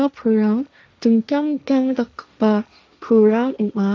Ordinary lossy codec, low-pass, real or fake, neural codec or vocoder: none; none; fake; codec, 16 kHz, 1.1 kbps, Voila-Tokenizer